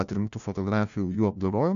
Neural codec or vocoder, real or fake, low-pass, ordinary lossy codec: codec, 16 kHz, 1 kbps, FunCodec, trained on LibriTTS, 50 frames a second; fake; 7.2 kHz; AAC, 96 kbps